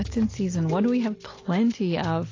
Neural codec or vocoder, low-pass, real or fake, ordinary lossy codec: none; 7.2 kHz; real; AAC, 32 kbps